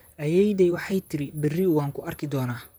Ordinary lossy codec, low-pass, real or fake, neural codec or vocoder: none; none; fake; vocoder, 44.1 kHz, 128 mel bands, Pupu-Vocoder